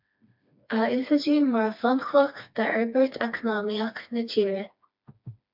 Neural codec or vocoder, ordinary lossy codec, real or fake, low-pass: codec, 16 kHz, 2 kbps, FreqCodec, smaller model; MP3, 48 kbps; fake; 5.4 kHz